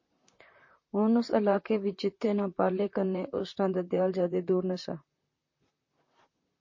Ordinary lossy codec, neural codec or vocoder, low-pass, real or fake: MP3, 32 kbps; vocoder, 44.1 kHz, 128 mel bands, Pupu-Vocoder; 7.2 kHz; fake